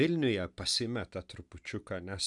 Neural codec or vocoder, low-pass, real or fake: none; 10.8 kHz; real